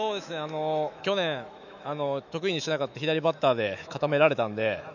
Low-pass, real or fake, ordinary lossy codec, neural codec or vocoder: 7.2 kHz; fake; none; autoencoder, 48 kHz, 128 numbers a frame, DAC-VAE, trained on Japanese speech